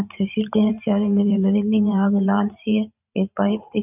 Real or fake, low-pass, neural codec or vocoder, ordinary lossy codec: fake; 3.6 kHz; vocoder, 44.1 kHz, 128 mel bands every 512 samples, BigVGAN v2; none